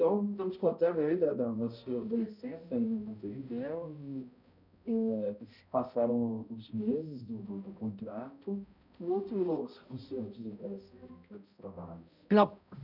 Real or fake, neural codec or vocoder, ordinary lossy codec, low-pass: fake; codec, 16 kHz, 0.5 kbps, X-Codec, HuBERT features, trained on balanced general audio; none; 5.4 kHz